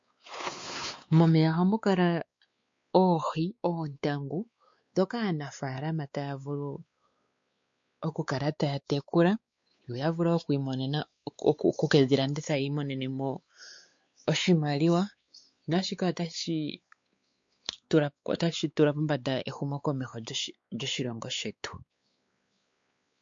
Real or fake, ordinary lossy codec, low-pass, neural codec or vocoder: fake; MP3, 48 kbps; 7.2 kHz; codec, 16 kHz, 4 kbps, X-Codec, WavLM features, trained on Multilingual LibriSpeech